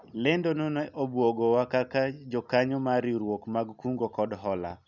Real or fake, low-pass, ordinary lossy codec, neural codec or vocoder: real; 7.2 kHz; none; none